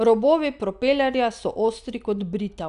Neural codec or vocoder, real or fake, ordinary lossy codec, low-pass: none; real; none; 10.8 kHz